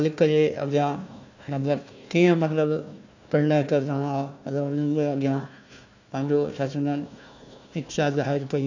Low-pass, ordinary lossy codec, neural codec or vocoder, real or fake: 7.2 kHz; none; codec, 16 kHz, 1 kbps, FunCodec, trained on Chinese and English, 50 frames a second; fake